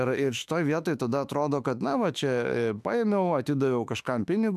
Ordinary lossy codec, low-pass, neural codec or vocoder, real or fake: AAC, 96 kbps; 14.4 kHz; autoencoder, 48 kHz, 32 numbers a frame, DAC-VAE, trained on Japanese speech; fake